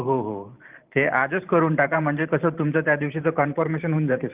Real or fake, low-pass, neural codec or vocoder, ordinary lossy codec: fake; 3.6 kHz; vocoder, 44.1 kHz, 128 mel bands every 512 samples, BigVGAN v2; Opus, 32 kbps